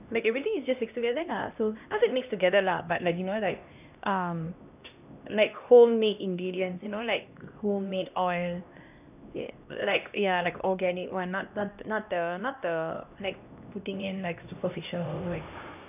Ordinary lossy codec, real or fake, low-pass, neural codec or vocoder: none; fake; 3.6 kHz; codec, 16 kHz, 1 kbps, X-Codec, HuBERT features, trained on LibriSpeech